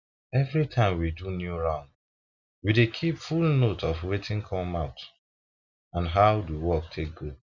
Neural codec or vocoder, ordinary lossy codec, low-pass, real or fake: none; none; 7.2 kHz; real